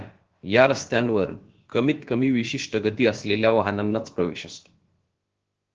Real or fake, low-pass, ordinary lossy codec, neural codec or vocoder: fake; 7.2 kHz; Opus, 16 kbps; codec, 16 kHz, about 1 kbps, DyCAST, with the encoder's durations